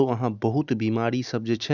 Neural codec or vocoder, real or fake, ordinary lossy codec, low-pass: none; real; none; 7.2 kHz